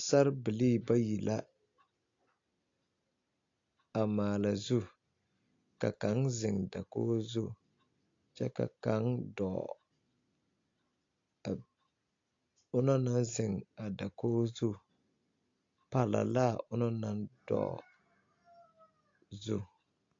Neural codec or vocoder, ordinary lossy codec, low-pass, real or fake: none; AAC, 48 kbps; 7.2 kHz; real